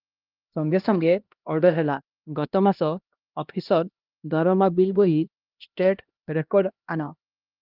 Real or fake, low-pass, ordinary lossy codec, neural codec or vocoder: fake; 5.4 kHz; Opus, 24 kbps; codec, 16 kHz, 1 kbps, X-Codec, HuBERT features, trained on LibriSpeech